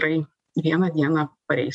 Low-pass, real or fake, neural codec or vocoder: 10.8 kHz; real; none